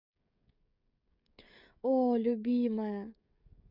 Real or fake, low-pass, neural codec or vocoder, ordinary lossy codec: fake; 5.4 kHz; codec, 16 kHz, 16 kbps, FreqCodec, smaller model; none